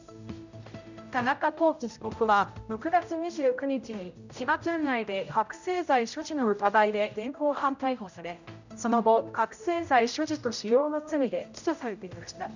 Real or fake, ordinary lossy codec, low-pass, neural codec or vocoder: fake; none; 7.2 kHz; codec, 16 kHz, 0.5 kbps, X-Codec, HuBERT features, trained on general audio